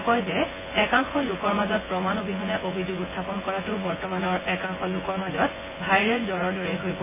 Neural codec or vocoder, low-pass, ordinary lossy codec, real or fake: vocoder, 24 kHz, 100 mel bands, Vocos; 3.6 kHz; MP3, 16 kbps; fake